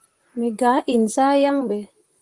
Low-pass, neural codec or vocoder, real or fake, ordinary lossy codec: 10.8 kHz; vocoder, 44.1 kHz, 128 mel bands, Pupu-Vocoder; fake; Opus, 24 kbps